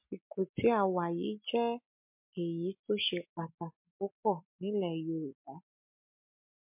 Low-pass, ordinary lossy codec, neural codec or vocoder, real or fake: 3.6 kHz; MP3, 32 kbps; none; real